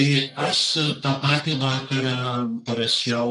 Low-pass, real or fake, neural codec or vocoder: 10.8 kHz; fake; codec, 44.1 kHz, 1.7 kbps, Pupu-Codec